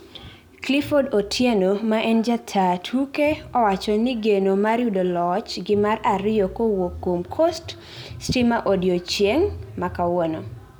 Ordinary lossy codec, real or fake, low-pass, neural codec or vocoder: none; real; none; none